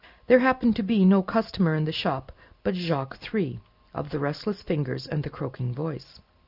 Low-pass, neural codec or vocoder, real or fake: 5.4 kHz; none; real